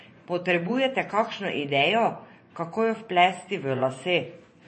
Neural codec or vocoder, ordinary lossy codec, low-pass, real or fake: vocoder, 24 kHz, 100 mel bands, Vocos; MP3, 32 kbps; 10.8 kHz; fake